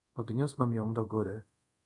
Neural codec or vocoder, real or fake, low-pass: codec, 24 kHz, 0.5 kbps, DualCodec; fake; 10.8 kHz